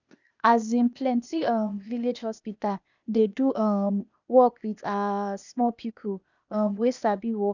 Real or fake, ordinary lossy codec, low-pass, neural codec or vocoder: fake; none; 7.2 kHz; codec, 16 kHz, 0.8 kbps, ZipCodec